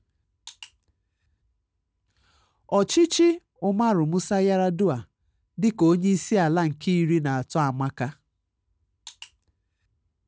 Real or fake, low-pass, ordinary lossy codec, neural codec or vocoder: real; none; none; none